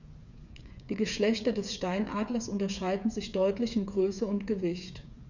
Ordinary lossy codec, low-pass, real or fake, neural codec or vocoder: none; 7.2 kHz; fake; codec, 16 kHz, 8 kbps, FreqCodec, smaller model